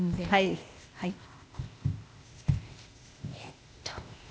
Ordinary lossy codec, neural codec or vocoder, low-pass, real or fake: none; codec, 16 kHz, 0.8 kbps, ZipCodec; none; fake